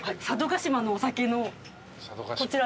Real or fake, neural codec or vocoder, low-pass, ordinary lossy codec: real; none; none; none